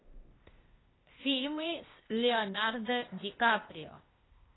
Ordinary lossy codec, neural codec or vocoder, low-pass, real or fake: AAC, 16 kbps; codec, 16 kHz, 0.8 kbps, ZipCodec; 7.2 kHz; fake